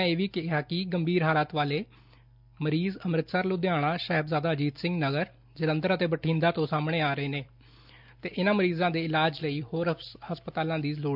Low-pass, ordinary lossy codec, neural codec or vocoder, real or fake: 5.4 kHz; none; none; real